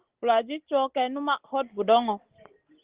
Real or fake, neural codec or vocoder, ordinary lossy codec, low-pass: real; none; Opus, 16 kbps; 3.6 kHz